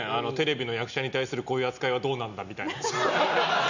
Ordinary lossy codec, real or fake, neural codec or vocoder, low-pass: none; real; none; 7.2 kHz